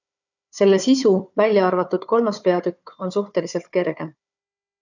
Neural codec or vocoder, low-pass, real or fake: codec, 16 kHz, 4 kbps, FunCodec, trained on Chinese and English, 50 frames a second; 7.2 kHz; fake